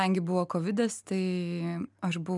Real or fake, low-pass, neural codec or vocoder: real; 10.8 kHz; none